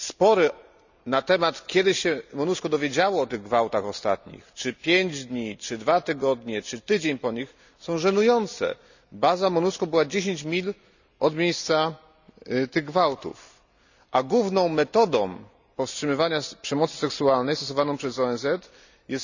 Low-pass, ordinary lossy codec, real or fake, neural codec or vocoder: 7.2 kHz; none; real; none